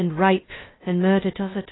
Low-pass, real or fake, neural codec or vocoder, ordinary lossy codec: 7.2 kHz; fake; codec, 16 kHz, 0.2 kbps, FocalCodec; AAC, 16 kbps